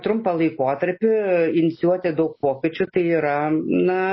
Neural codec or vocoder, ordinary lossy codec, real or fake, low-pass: none; MP3, 24 kbps; real; 7.2 kHz